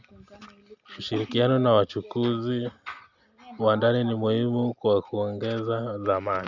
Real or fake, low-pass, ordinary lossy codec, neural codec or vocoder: real; 7.2 kHz; none; none